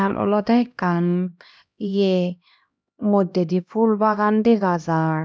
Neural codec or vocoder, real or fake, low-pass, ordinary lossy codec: codec, 16 kHz, 1 kbps, X-Codec, HuBERT features, trained on LibriSpeech; fake; none; none